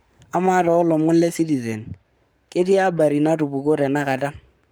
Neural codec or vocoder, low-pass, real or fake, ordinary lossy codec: codec, 44.1 kHz, 7.8 kbps, Pupu-Codec; none; fake; none